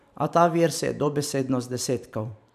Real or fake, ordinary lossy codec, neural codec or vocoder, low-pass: fake; none; vocoder, 44.1 kHz, 128 mel bands every 512 samples, BigVGAN v2; 14.4 kHz